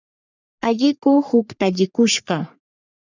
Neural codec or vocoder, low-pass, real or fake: codec, 44.1 kHz, 3.4 kbps, Pupu-Codec; 7.2 kHz; fake